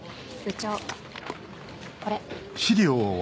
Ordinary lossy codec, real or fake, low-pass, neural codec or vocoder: none; real; none; none